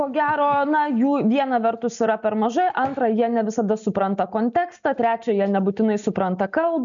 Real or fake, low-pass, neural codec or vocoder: real; 7.2 kHz; none